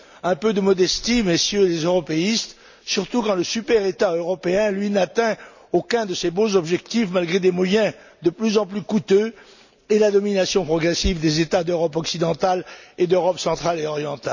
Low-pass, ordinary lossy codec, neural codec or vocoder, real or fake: 7.2 kHz; none; none; real